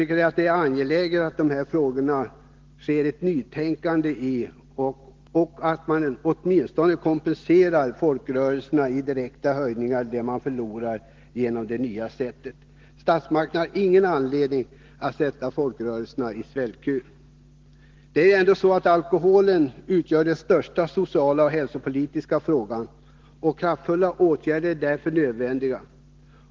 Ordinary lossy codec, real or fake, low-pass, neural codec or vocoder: Opus, 16 kbps; real; 7.2 kHz; none